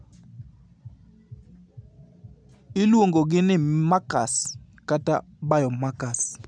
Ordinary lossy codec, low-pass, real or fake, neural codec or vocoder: none; 9.9 kHz; real; none